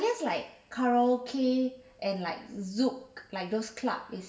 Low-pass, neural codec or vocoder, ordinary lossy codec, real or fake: none; none; none; real